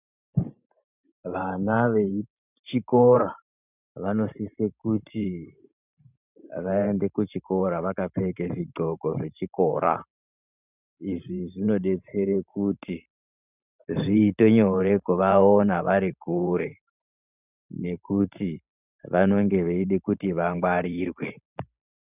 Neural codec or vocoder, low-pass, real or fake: vocoder, 44.1 kHz, 128 mel bands every 512 samples, BigVGAN v2; 3.6 kHz; fake